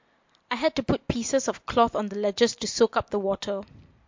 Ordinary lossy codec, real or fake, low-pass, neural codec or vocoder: MP3, 48 kbps; real; 7.2 kHz; none